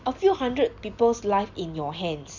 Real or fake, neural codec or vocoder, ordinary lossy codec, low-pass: real; none; none; 7.2 kHz